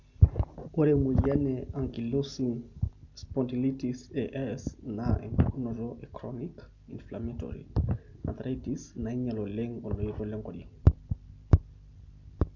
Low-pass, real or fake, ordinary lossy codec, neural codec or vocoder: 7.2 kHz; real; none; none